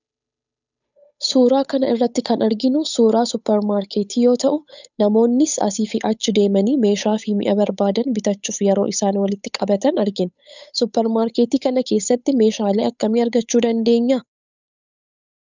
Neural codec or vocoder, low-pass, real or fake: codec, 16 kHz, 8 kbps, FunCodec, trained on Chinese and English, 25 frames a second; 7.2 kHz; fake